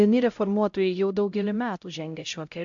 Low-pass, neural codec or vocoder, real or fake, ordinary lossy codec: 7.2 kHz; codec, 16 kHz, 0.5 kbps, X-Codec, HuBERT features, trained on LibriSpeech; fake; AAC, 64 kbps